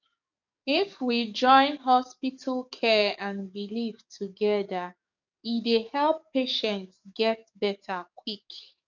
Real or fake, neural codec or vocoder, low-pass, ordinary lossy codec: fake; codec, 44.1 kHz, 7.8 kbps, DAC; 7.2 kHz; none